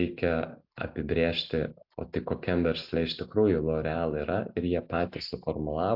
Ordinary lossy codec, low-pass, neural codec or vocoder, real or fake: AAC, 48 kbps; 5.4 kHz; none; real